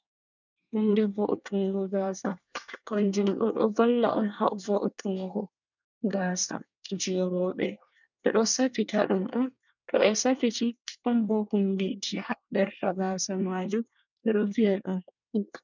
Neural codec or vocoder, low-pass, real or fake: codec, 24 kHz, 1 kbps, SNAC; 7.2 kHz; fake